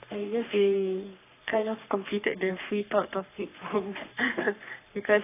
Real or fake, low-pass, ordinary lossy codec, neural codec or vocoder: fake; 3.6 kHz; AAC, 24 kbps; codec, 44.1 kHz, 3.4 kbps, Pupu-Codec